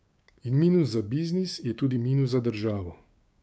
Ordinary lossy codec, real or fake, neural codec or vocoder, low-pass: none; fake; codec, 16 kHz, 6 kbps, DAC; none